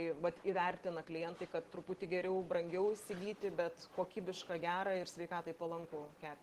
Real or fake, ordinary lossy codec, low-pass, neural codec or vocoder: real; Opus, 16 kbps; 14.4 kHz; none